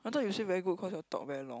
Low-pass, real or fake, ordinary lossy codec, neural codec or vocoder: none; real; none; none